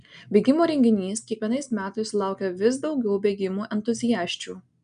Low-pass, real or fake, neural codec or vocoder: 9.9 kHz; real; none